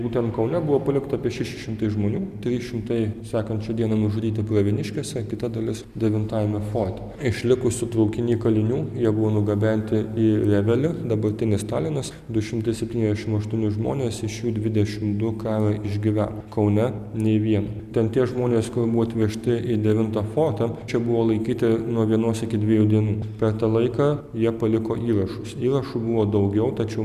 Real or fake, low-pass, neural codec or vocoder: real; 14.4 kHz; none